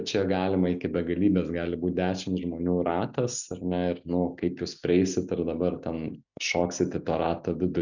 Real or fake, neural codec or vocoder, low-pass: real; none; 7.2 kHz